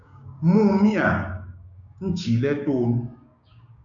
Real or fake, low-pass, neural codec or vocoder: fake; 7.2 kHz; codec, 16 kHz, 6 kbps, DAC